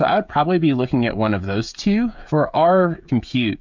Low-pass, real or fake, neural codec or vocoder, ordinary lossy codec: 7.2 kHz; fake; codec, 16 kHz, 16 kbps, FreqCodec, smaller model; MP3, 64 kbps